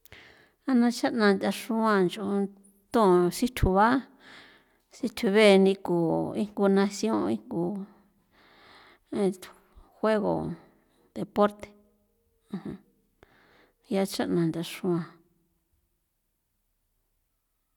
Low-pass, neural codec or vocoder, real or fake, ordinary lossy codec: 19.8 kHz; none; real; none